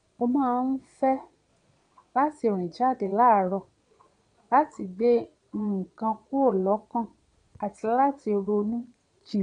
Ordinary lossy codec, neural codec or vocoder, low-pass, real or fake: MP3, 64 kbps; vocoder, 22.05 kHz, 80 mel bands, Vocos; 9.9 kHz; fake